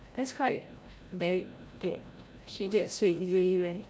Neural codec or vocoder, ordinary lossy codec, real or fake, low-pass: codec, 16 kHz, 0.5 kbps, FreqCodec, larger model; none; fake; none